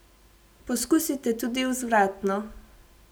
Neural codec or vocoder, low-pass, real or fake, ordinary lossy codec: none; none; real; none